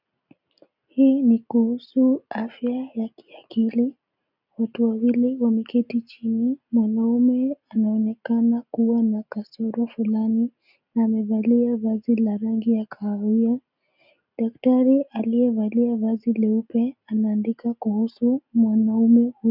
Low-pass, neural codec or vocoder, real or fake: 5.4 kHz; none; real